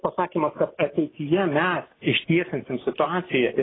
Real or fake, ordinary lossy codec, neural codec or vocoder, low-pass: real; AAC, 16 kbps; none; 7.2 kHz